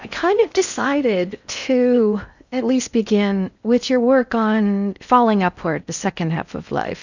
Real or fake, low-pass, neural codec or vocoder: fake; 7.2 kHz; codec, 16 kHz in and 24 kHz out, 0.6 kbps, FocalCodec, streaming, 4096 codes